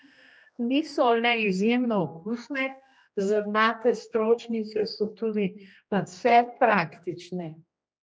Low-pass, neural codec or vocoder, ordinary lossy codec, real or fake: none; codec, 16 kHz, 1 kbps, X-Codec, HuBERT features, trained on general audio; none; fake